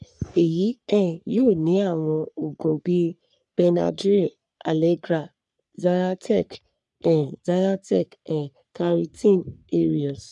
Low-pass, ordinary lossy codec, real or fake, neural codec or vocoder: 10.8 kHz; none; fake; codec, 44.1 kHz, 3.4 kbps, Pupu-Codec